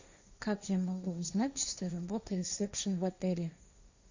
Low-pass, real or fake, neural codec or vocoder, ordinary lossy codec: 7.2 kHz; fake; codec, 16 kHz, 1.1 kbps, Voila-Tokenizer; Opus, 64 kbps